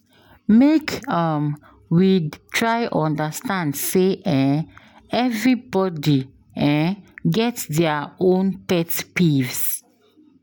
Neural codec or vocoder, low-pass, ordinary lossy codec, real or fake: none; none; none; real